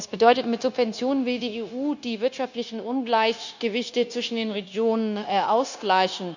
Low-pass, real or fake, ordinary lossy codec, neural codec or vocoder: 7.2 kHz; fake; none; codec, 16 kHz, 0.9 kbps, LongCat-Audio-Codec